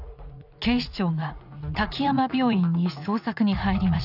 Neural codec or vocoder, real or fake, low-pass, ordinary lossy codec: vocoder, 44.1 kHz, 80 mel bands, Vocos; fake; 5.4 kHz; none